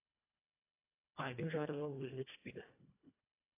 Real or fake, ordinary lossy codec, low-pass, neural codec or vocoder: fake; AAC, 24 kbps; 3.6 kHz; codec, 24 kHz, 1.5 kbps, HILCodec